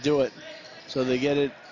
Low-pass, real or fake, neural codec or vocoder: 7.2 kHz; real; none